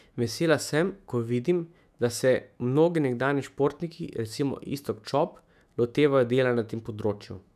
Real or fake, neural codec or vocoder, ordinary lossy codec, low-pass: fake; autoencoder, 48 kHz, 128 numbers a frame, DAC-VAE, trained on Japanese speech; none; 14.4 kHz